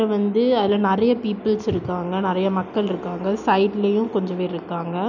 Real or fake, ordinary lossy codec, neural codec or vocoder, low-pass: real; none; none; 7.2 kHz